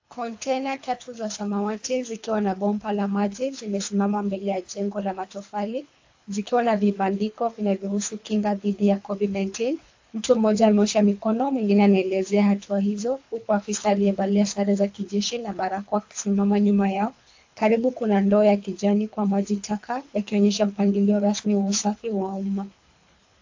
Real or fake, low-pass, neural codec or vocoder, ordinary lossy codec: fake; 7.2 kHz; codec, 24 kHz, 3 kbps, HILCodec; AAC, 48 kbps